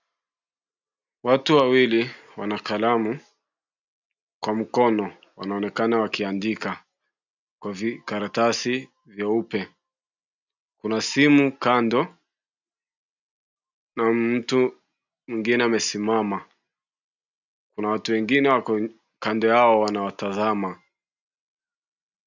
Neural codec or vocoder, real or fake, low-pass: none; real; 7.2 kHz